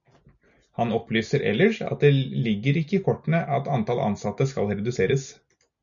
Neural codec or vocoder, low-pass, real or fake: none; 7.2 kHz; real